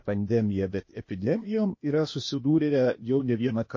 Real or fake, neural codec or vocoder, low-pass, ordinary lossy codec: fake; codec, 16 kHz, 0.8 kbps, ZipCodec; 7.2 kHz; MP3, 32 kbps